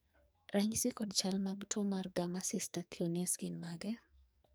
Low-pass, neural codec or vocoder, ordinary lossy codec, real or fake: none; codec, 44.1 kHz, 2.6 kbps, SNAC; none; fake